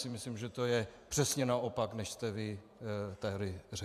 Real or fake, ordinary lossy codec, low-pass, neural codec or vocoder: fake; AAC, 96 kbps; 14.4 kHz; vocoder, 48 kHz, 128 mel bands, Vocos